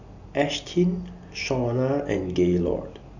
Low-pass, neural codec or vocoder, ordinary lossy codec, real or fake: 7.2 kHz; none; none; real